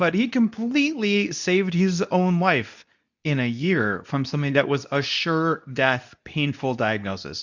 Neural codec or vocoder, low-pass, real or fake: codec, 24 kHz, 0.9 kbps, WavTokenizer, medium speech release version 2; 7.2 kHz; fake